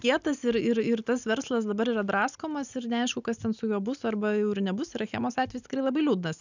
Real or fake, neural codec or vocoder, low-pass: real; none; 7.2 kHz